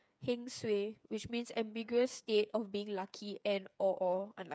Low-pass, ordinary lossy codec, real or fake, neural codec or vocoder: none; none; fake; codec, 16 kHz, 8 kbps, FreqCodec, smaller model